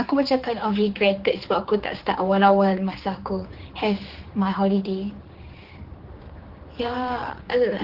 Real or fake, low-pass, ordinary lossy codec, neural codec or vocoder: fake; 5.4 kHz; Opus, 16 kbps; codec, 16 kHz, 4 kbps, X-Codec, HuBERT features, trained on general audio